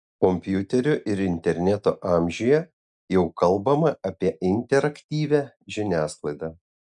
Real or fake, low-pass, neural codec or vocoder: real; 10.8 kHz; none